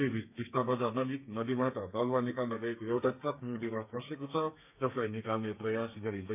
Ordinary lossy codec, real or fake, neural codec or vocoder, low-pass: none; fake; codec, 32 kHz, 1.9 kbps, SNAC; 3.6 kHz